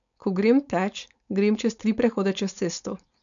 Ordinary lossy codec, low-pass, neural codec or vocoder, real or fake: none; 7.2 kHz; codec, 16 kHz, 4.8 kbps, FACodec; fake